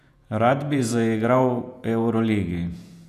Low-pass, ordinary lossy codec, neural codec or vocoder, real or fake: 14.4 kHz; none; vocoder, 48 kHz, 128 mel bands, Vocos; fake